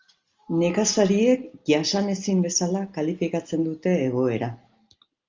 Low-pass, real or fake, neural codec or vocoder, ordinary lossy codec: 7.2 kHz; real; none; Opus, 32 kbps